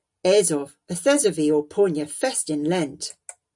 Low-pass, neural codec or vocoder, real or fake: 10.8 kHz; none; real